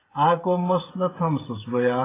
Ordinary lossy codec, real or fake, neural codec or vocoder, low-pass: AAC, 16 kbps; fake; codec, 16 kHz, 16 kbps, FreqCodec, smaller model; 3.6 kHz